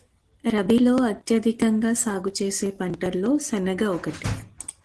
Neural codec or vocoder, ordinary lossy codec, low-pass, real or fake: none; Opus, 16 kbps; 10.8 kHz; real